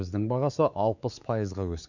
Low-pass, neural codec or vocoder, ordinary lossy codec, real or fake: 7.2 kHz; codec, 16 kHz, 4 kbps, X-Codec, WavLM features, trained on Multilingual LibriSpeech; none; fake